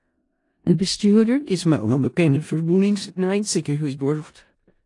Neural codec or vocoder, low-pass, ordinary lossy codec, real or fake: codec, 16 kHz in and 24 kHz out, 0.4 kbps, LongCat-Audio-Codec, four codebook decoder; 10.8 kHz; AAC, 48 kbps; fake